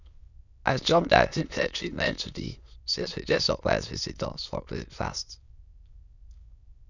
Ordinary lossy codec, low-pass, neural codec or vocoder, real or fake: none; 7.2 kHz; autoencoder, 22.05 kHz, a latent of 192 numbers a frame, VITS, trained on many speakers; fake